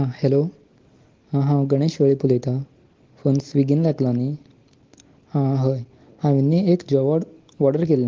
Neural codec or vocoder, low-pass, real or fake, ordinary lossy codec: none; 7.2 kHz; real; Opus, 16 kbps